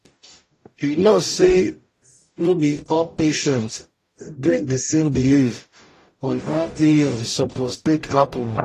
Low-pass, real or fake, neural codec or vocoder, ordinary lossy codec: 14.4 kHz; fake; codec, 44.1 kHz, 0.9 kbps, DAC; AAC, 48 kbps